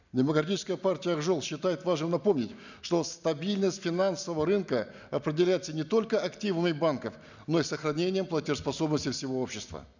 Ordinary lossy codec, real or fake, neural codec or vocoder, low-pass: none; real; none; 7.2 kHz